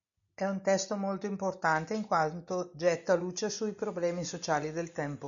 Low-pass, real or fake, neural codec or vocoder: 7.2 kHz; real; none